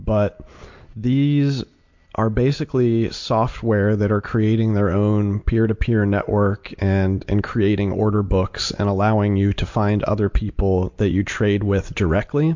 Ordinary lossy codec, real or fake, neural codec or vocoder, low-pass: MP3, 48 kbps; real; none; 7.2 kHz